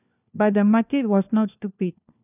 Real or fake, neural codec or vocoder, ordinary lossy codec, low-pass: fake; codec, 16 kHz, 1 kbps, FunCodec, trained on LibriTTS, 50 frames a second; none; 3.6 kHz